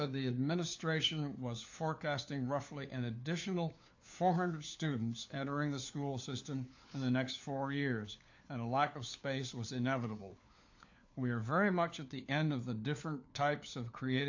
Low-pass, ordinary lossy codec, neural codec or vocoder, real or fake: 7.2 kHz; AAC, 48 kbps; codec, 16 kHz, 4 kbps, FunCodec, trained on LibriTTS, 50 frames a second; fake